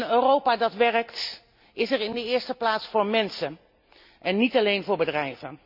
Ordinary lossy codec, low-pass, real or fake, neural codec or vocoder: none; 5.4 kHz; real; none